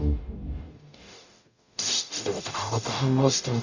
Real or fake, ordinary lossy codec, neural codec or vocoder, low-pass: fake; none; codec, 44.1 kHz, 0.9 kbps, DAC; 7.2 kHz